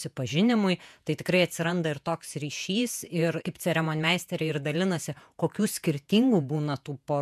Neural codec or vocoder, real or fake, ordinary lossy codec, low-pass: vocoder, 48 kHz, 128 mel bands, Vocos; fake; MP3, 96 kbps; 14.4 kHz